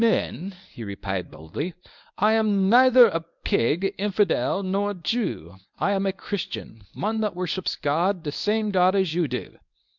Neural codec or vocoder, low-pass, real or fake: codec, 24 kHz, 0.9 kbps, WavTokenizer, medium speech release version 1; 7.2 kHz; fake